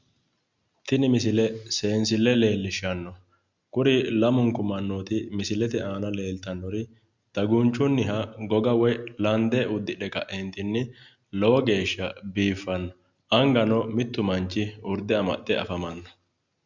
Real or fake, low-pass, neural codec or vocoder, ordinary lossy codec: real; 7.2 kHz; none; Opus, 64 kbps